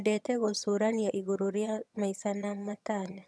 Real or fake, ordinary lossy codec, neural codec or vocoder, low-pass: fake; none; vocoder, 22.05 kHz, 80 mel bands, HiFi-GAN; none